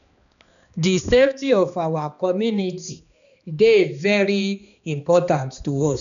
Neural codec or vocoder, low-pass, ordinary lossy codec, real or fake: codec, 16 kHz, 2 kbps, X-Codec, HuBERT features, trained on balanced general audio; 7.2 kHz; none; fake